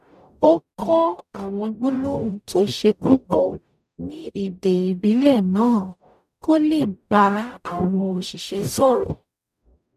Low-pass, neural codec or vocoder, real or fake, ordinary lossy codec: 14.4 kHz; codec, 44.1 kHz, 0.9 kbps, DAC; fake; none